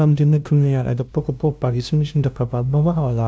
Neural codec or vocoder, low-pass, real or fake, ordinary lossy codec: codec, 16 kHz, 0.5 kbps, FunCodec, trained on LibriTTS, 25 frames a second; none; fake; none